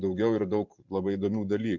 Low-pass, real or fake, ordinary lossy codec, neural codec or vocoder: 7.2 kHz; fake; MP3, 64 kbps; vocoder, 44.1 kHz, 128 mel bands every 512 samples, BigVGAN v2